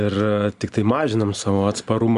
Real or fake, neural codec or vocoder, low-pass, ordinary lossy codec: real; none; 10.8 kHz; Opus, 64 kbps